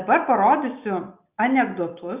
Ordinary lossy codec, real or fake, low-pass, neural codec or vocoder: Opus, 64 kbps; real; 3.6 kHz; none